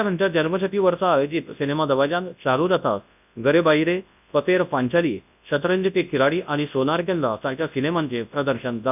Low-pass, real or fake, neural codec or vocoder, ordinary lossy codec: 3.6 kHz; fake; codec, 24 kHz, 0.9 kbps, WavTokenizer, large speech release; none